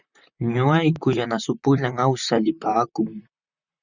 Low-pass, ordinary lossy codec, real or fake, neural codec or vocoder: 7.2 kHz; Opus, 64 kbps; fake; vocoder, 44.1 kHz, 128 mel bands, Pupu-Vocoder